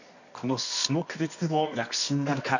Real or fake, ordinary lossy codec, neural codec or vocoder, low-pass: fake; none; codec, 24 kHz, 0.9 kbps, WavTokenizer, medium music audio release; 7.2 kHz